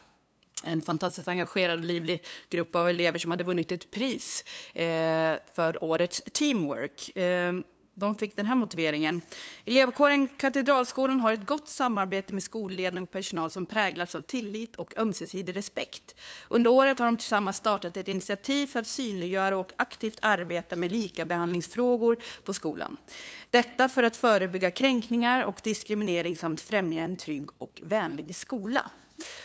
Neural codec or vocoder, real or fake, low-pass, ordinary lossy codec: codec, 16 kHz, 2 kbps, FunCodec, trained on LibriTTS, 25 frames a second; fake; none; none